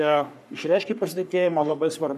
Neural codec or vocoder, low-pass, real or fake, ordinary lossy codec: codec, 44.1 kHz, 3.4 kbps, Pupu-Codec; 14.4 kHz; fake; AAC, 96 kbps